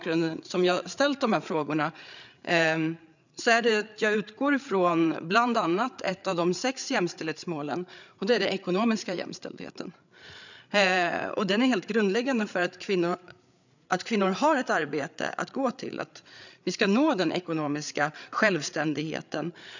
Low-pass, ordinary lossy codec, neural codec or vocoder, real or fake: 7.2 kHz; none; codec, 16 kHz, 8 kbps, FreqCodec, larger model; fake